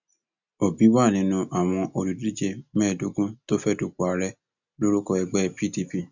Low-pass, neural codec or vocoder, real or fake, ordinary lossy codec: 7.2 kHz; none; real; none